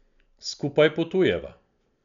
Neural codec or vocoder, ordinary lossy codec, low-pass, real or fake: none; none; 7.2 kHz; real